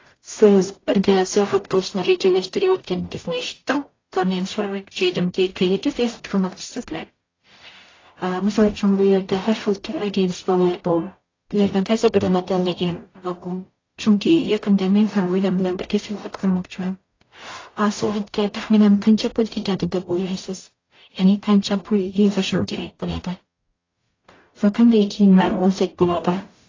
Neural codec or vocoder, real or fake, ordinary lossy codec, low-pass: codec, 44.1 kHz, 0.9 kbps, DAC; fake; AAC, 32 kbps; 7.2 kHz